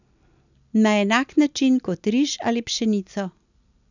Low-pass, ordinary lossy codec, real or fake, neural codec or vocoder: 7.2 kHz; none; real; none